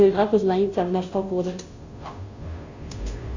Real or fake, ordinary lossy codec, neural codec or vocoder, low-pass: fake; AAC, 48 kbps; codec, 16 kHz, 0.5 kbps, FunCodec, trained on Chinese and English, 25 frames a second; 7.2 kHz